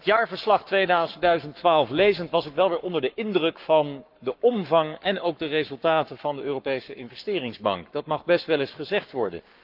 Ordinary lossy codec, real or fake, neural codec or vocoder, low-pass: Opus, 24 kbps; fake; codec, 44.1 kHz, 7.8 kbps, Pupu-Codec; 5.4 kHz